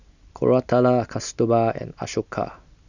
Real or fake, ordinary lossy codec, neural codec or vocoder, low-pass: real; none; none; 7.2 kHz